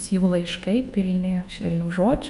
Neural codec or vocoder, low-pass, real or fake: codec, 24 kHz, 1.2 kbps, DualCodec; 10.8 kHz; fake